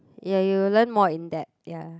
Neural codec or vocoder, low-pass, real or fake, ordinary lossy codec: none; none; real; none